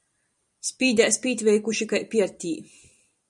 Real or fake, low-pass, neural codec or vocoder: fake; 10.8 kHz; vocoder, 44.1 kHz, 128 mel bands every 256 samples, BigVGAN v2